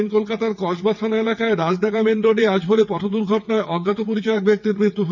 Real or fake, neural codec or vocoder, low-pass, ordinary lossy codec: fake; vocoder, 22.05 kHz, 80 mel bands, WaveNeXt; 7.2 kHz; none